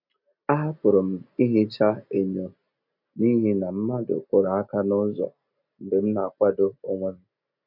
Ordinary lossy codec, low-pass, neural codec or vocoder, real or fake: none; 5.4 kHz; none; real